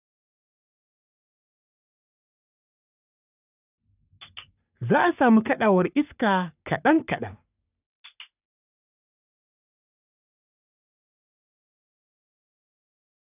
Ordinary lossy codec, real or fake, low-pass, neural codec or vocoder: none; fake; 3.6 kHz; codec, 44.1 kHz, 3.4 kbps, Pupu-Codec